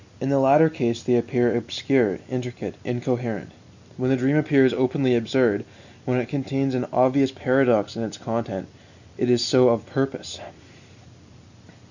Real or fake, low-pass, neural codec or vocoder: real; 7.2 kHz; none